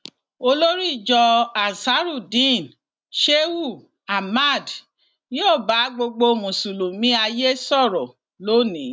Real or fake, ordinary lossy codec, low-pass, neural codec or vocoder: real; none; none; none